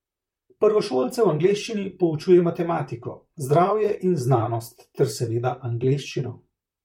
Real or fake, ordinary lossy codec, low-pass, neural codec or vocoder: fake; MP3, 64 kbps; 19.8 kHz; vocoder, 44.1 kHz, 128 mel bands, Pupu-Vocoder